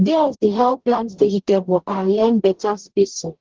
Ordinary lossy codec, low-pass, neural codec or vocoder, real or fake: Opus, 16 kbps; 7.2 kHz; codec, 44.1 kHz, 0.9 kbps, DAC; fake